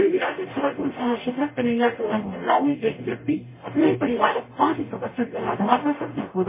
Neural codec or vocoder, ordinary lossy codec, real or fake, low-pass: codec, 44.1 kHz, 0.9 kbps, DAC; MP3, 16 kbps; fake; 3.6 kHz